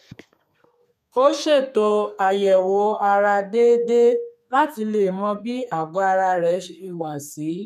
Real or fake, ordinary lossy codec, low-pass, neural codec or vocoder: fake; none; 14.4 kHz; codec, 32 kHz, 1.9 kbps, SNAC